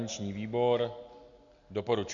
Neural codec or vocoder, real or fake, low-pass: none; real; 7.2 kHz